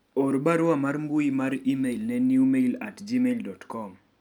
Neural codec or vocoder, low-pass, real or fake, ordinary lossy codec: none; 19.8 kHz; real; none